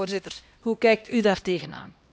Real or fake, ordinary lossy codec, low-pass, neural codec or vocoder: fake; none; none; codec, 16 kHz, 1 kbps, X-Codec, HuBERT features, trained on LibriSpeech